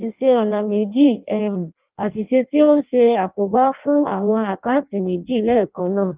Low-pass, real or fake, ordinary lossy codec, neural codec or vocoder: 3.6 kHz; fake; Opus, 32 kbps; codec, 16 kHz in and 24 kHz out, 0.6 kbps, FireRedTTS-2 codec